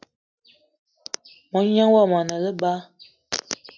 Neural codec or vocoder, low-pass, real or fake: none; 7.2 kHz; real